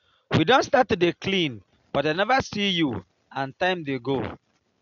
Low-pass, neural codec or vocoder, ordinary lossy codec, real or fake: 7.2 kHz; none; none; real